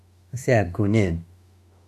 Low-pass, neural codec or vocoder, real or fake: 14.4 kHz; autoencoder, 48 kHz, 32 numbers a frame, DAC-VAE, trained on Japanese speech; fake